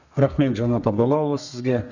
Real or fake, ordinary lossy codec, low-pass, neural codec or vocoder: fake; none; 7.2 kHz; codec, 32 kHz, 1.9 kbps, SNAC